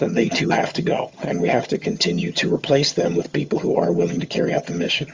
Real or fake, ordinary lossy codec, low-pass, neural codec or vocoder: fake; Opus, 32 kbps; 7.2 kHz; vocoder, 22.05 kHz, 80 mel bands, HiFi-GAN